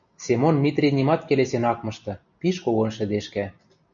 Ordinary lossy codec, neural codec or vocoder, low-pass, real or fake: MP3, 96 kbps; none; 7.2 kHz; real